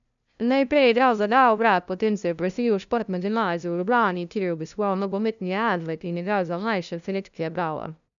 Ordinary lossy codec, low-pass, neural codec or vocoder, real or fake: none; 7.2 kHz; codec, 16 kHz, 0.5 kbps, FunCodec, trained on LibriTTS, 25 frames a second; fake